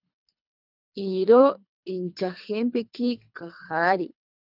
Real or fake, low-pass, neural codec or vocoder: fake; 5.4 kHz; codec, 24 kHz, 3 kbps, HILCodec